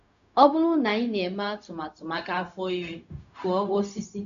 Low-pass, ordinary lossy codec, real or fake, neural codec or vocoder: 7.2 kHz; none; fake; codec, 16 kHz, 0.4 kbps, LongCat-Audio-Codec